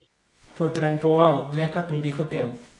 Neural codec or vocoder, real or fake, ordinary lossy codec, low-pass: codec, 24 kHz, 0.9 kbps, WavTokenizer, medium music audio release; fake; AAC, 48 kbps; 10.8 kHz